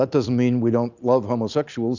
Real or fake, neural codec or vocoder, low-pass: real; none; 7.2 kHz